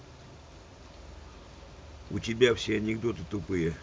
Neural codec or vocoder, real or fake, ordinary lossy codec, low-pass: none; real; none; none